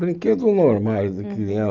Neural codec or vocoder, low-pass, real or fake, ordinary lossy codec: none; 7.2 kHz; real; Opus, 24 kbps